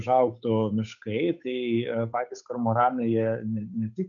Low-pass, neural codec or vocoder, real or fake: 7.2 kHz; codec, 16 kHz, 8 kbps, FunCodec, trained on Chinese and English, 25 frames a second; fake